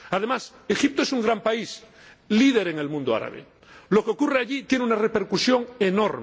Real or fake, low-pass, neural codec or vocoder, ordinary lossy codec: real; none; none; none